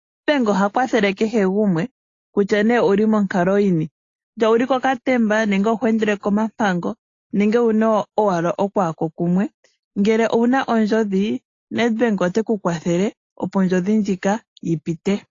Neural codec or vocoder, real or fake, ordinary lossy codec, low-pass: none; real; AAC, 32 kbps; 7.2 kHz